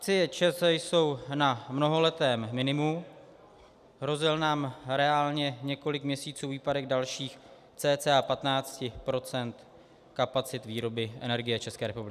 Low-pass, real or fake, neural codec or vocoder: 14.4 kHz; real; none